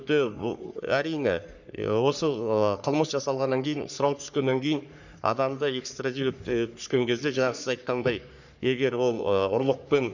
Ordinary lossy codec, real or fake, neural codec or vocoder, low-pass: none; fake; codec, 44.1 kHz, 3.4 kbps, Pupu-Codec; 7.2 kHz